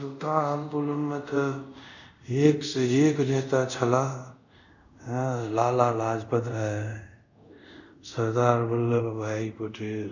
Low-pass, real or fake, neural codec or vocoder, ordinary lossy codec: 7.2 kHz; fake; codec, 24 kHz, 0.5 kbps, DualCodec; none